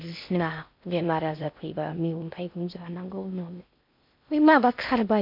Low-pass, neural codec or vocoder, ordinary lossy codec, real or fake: 5.4 kHz; codec, 16 kHz in and 24 kHz out, 0.6 kbps, FocalCodec, streaming, 4096 codes; MP3, 48 kbps; fake